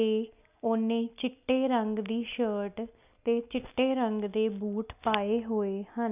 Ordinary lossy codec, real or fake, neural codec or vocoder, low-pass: none; real; none; 3.6 kHz